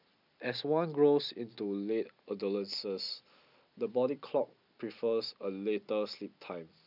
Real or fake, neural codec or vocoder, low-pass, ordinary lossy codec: real; none; 5.4 kHz; none